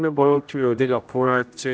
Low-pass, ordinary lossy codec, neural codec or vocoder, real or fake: none; none; codec, 16 kHz, 0.5 kbps, X-Codec, HuBERT features, trained on general audio; fake